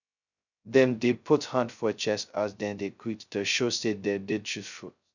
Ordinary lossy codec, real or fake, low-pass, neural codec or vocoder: none; fake; 7.2 kHz; codec, 16 kHz, 0.2 kbps, FocalCodec